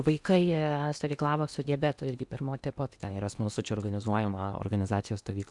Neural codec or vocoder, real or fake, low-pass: codec, 16 kHz in and 24 kHz out, 0.8 kbps, FocalCodec, streaming, 65536 codes; fake; 10.8 kHz